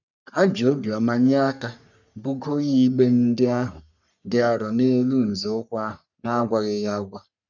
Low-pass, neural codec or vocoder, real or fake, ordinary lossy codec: 7.2 kHz; codec, 44.1 kHz, 3.4 kbps, Pupu-Codec; fake; none